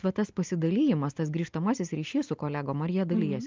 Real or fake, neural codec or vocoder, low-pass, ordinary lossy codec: real; none; 7.2 kHz; Opus, 32 kbps